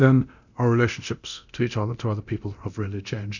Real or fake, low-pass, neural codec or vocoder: fake; 7.2 kHz; codec, 24 kHz, 0.9 kbps, DualCodec